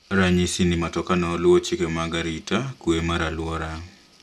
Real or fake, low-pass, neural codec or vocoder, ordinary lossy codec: real; none; none; none